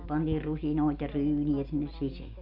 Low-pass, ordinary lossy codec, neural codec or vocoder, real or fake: 5.4 kHz; Opus, 64 kbps; none; real